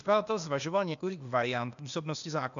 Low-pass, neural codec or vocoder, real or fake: 7.2 kHz; codec, 16 kHz, 0.8 kbps, ZipCodec; fake